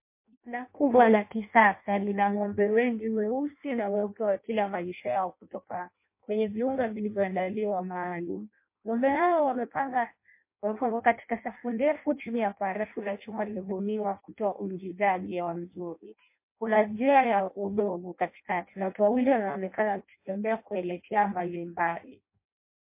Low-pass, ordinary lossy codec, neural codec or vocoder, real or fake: 3.6 kHz; MP3, 24 kbps; codec, 16 kHz in and 24 kHz out, 0.6 kbps, FireRedTTS-2 codec; fake